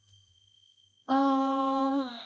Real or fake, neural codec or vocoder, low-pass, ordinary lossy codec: fake; codec, 16 kHz, 1 kbps, X-Codec, HuBERT features, trained on balanced general audio; none; none